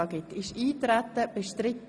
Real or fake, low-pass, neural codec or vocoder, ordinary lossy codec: real; none; none; none